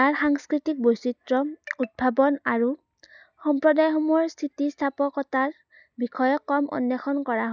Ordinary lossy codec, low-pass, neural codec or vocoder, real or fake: none; 7.2 kHz; none; real